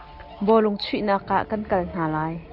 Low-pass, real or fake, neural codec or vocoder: 5.4 kHz; real; none